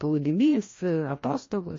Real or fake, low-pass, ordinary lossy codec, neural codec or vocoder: fake; 7.2 kHz; MP3, 32 kbps; codec, 16 kHz, 1 kbps, FreqCodec, larger model